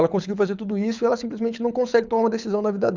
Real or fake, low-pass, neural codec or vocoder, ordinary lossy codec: fake; 7.2 kHz; codec, 24 kHz, 6 kbps, HILCodec; none